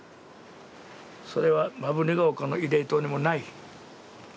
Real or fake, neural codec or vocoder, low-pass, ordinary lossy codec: real; none; none; none